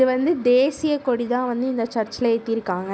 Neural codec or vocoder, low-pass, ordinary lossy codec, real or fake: none; none; none; real